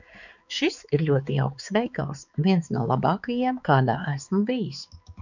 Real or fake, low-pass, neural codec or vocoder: fake; 7.2 kHz; codec, 16 kHz, 4 kbps, X-Codec, HuBERT features, trained on balanced general audio